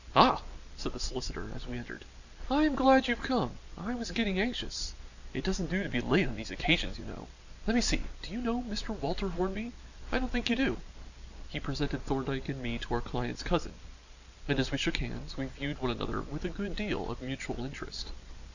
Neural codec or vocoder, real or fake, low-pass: none; real; 7.2 kHz